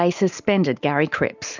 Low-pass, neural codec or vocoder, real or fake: 7.2 kHz; none; real